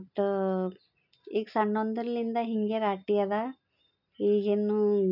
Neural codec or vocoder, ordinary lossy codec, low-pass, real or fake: none; none; 5.4 kHz; real